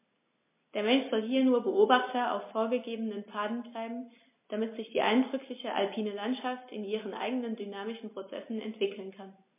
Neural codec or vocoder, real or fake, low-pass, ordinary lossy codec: none; real; 3.6 kHz; MP3, 24 kbps